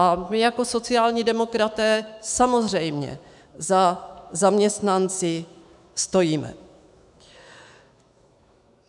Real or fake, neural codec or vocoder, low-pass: fake; autoencoder, 48 kHz, 128 numbers a frame, DAC-VAE, trained on Japanese speech; 10.8 kHz